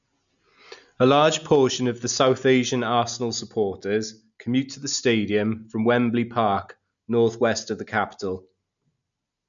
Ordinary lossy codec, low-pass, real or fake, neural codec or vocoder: none; 7.2 kHz; real; none